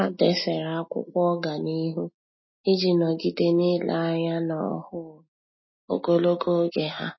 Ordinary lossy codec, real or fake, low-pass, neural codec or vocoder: MP3, 24 kbps; real; 7.2 kHz; none